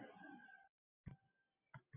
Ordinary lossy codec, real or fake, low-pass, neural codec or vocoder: AAC, 16 kbps; real; 3.6 kHz; none